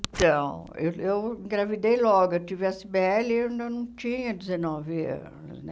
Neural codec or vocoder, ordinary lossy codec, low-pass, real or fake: none; none; none; real